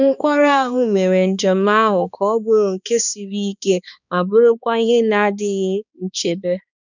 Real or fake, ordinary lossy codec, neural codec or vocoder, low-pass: fake; none; codec, 16 kHz, 2 kbps, X-Codec, HuBERT features, trained on balanced general audio; 7.2 kHz